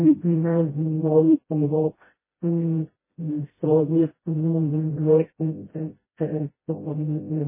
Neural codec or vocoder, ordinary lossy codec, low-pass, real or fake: codec, 16 kHz, 0.5 kbps, FreqCodec, smaller model; MP3, 16 kbps; 3.6 kHz; fake